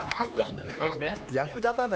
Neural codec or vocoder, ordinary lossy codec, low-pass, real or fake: codec, 16 kHz, 2 kbps, X-Codec, HuBERT features, trained on LibriSpeech; none; none; fake